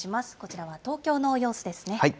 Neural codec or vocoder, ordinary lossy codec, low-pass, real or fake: none; none; none; real